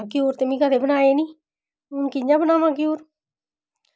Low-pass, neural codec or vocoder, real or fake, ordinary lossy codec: none; none; real; none